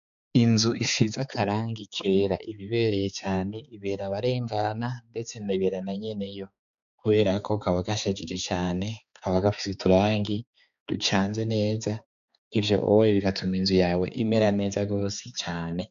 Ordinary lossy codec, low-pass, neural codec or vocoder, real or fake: MP3, 96 kbps; 7.2 kHz; codec, 16 kHz, 4 kbps, X-Codec, HuBERT features, trained on balanced general audio; fake